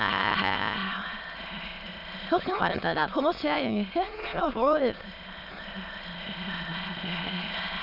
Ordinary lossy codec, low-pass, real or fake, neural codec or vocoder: none; 5.4 kHz; fake; autoencoder, 22.05 kHz, a latent of 192 numbers a frame, VITS, trained on many speakers